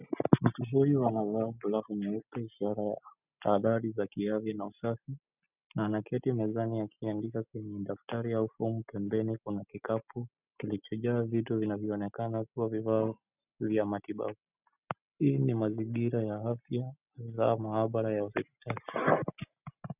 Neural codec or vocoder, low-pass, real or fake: none; 3.6 kHz; real